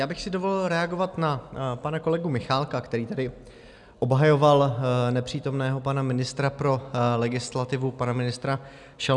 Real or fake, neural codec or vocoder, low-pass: real; none; 10.8 kHz